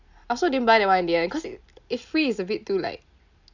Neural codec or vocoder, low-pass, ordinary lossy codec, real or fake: none; 7.2 kHz; none; real